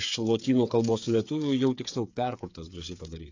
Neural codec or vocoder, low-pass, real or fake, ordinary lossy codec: codec, 16 kHz, 8 kbps, FreqCodec, smaller model; 7.2 kHz; fake; AAC, 48 kbps